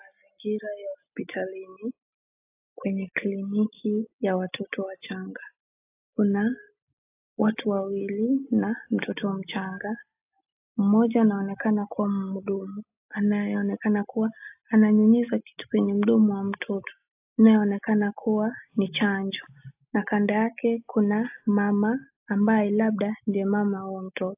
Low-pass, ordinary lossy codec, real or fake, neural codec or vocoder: 3.6 kHz; AAC, 32 kbps; real; none